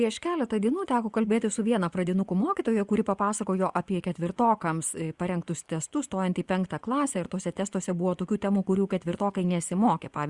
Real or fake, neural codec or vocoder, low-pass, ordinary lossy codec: fake; vocoder, 24 kHz, 100 mel bands, Vocos; 10.8 kHz; Opus, 64 kbps